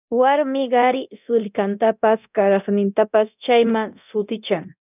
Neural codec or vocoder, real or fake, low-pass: codec, 24 kHz, 0.9 kbps, DualCodec; fake; 3.6 kHz